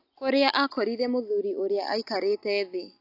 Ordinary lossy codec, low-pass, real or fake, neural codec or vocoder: AAC, 32 kbps; 5.4 kHz; real; none